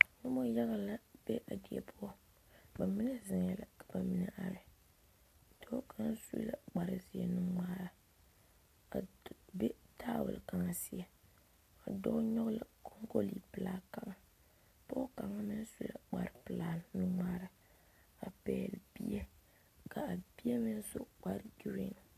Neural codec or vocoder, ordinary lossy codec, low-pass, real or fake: none; AAC, 96 kbps; 14.4 kHz; real